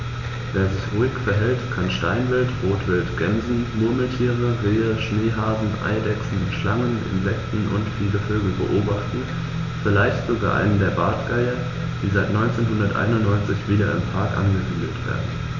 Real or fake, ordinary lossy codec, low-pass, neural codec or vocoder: real; none; 7.2 kHz; none